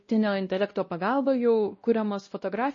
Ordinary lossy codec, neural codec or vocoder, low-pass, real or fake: MP3, 32 kbps; codec, 16 kHz, 1 kbps, X-Codec, WavLM features, trained on Multilingual LibriSpeech; 7.2 kHz; fake